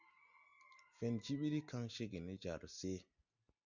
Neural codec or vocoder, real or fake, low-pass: none; real; 7.2 kHz